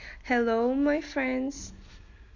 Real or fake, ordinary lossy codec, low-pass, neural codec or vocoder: real; Opus, 64 kbps; 7.2 kHz; none